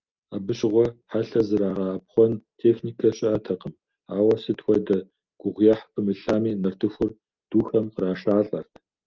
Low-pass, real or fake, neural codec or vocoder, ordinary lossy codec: 7.2 kHz; real; none; Opus, 24 kbps